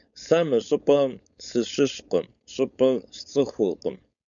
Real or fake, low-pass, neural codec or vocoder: fake; 7.2 kHz; codec, 16 kHz, 4.8 kbps, FACodec